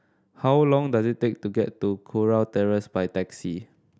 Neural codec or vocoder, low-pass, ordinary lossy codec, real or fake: none; none; none; real